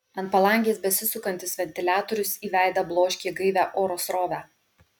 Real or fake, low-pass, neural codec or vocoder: real; 19.8 kHz; none